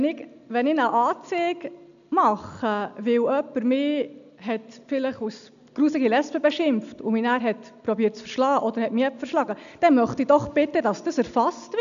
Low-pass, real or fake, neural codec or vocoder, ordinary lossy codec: 7.2 kHz; real; none; none